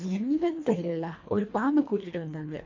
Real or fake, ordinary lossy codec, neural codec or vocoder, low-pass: fake; MP3, 48 kbps; codec, 24 kHz, 1.5 kbps, HILCodec; 7.2 kHz